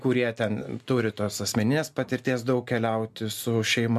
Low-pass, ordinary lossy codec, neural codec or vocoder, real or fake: 14.4 kHz; MP3, 96 kbps; none; real